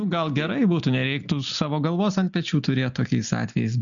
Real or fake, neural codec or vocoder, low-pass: real; none; 7.2 kHz